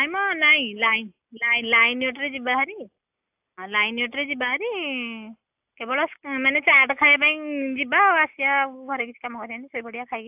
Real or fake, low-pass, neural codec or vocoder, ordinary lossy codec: real; 3.6 kHz; none; AAC, 32 kbps